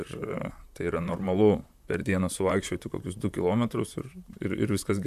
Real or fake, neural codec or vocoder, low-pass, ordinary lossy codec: fake; vocoder, 44.1 kHz, 128 mel bands, Pupu-Vocoder; 14.4 kHz; MP3, 96 kbps